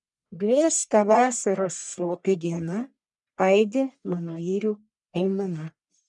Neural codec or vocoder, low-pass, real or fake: codec, 44.1 kHz, 1.7 kbps, Pupu-Codec; 10.8 kHz; fake